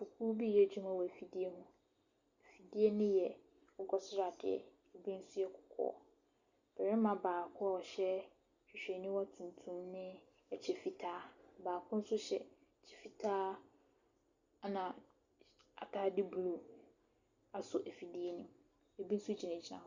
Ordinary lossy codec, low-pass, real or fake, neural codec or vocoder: Opus, 64 kbps; 7.2 kHz; real; none